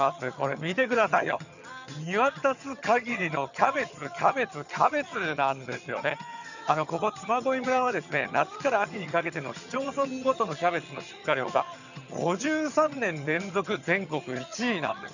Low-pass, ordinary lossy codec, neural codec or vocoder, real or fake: 7.2 kHz; none; vocoder, 22.05 kHz, 80 mel bands, HiFi-GAN; fake